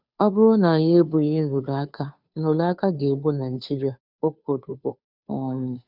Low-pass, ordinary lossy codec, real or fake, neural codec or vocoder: 5.4 kHz; none; fake; codec, 16 kHz, 2 kbps, FunCodec, trained on Chinese and English, 25 frames a second